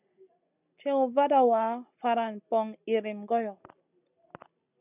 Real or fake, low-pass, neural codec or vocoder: real; 3.6 kHz; none